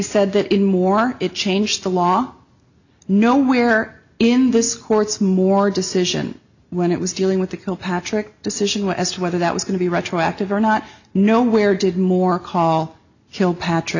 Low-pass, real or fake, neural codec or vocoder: 7.2 kHz; real; none